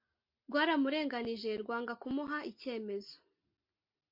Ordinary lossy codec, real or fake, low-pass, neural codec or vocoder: MP3, 32 kbps; real; 5.4 kHz; none